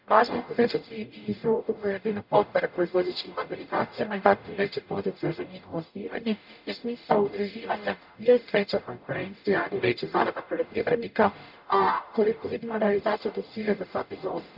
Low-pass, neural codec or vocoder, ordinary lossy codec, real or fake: 5.4 kHz; codec, 44.1 kHz, 0.9 kbps, DAC; none; fake